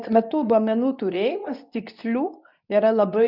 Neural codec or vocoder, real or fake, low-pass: codec, 24 kHz, 0.9 kbps, WavTokenizer, medium speech release version 2; fake; 5.4 kHz